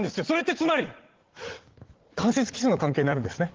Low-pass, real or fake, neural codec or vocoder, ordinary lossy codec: 7.2 kHz; fake; vocoder, 44.1 kHz, 80 mel bands, Vocos; Opus, 16 kbps